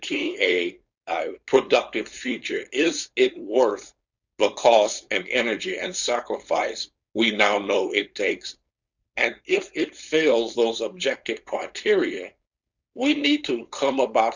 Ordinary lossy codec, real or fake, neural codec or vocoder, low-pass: Opus, 64 kbps; fake; codec, 16 kHz, 4.8 kbps, FACodec; 7.2 kHz